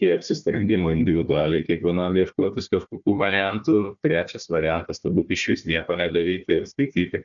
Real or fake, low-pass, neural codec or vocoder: fake; 7.2 kHz; codec, 16 kHz, 1 kbps, FunCodec, trained on Chinese and English, 50 frames a second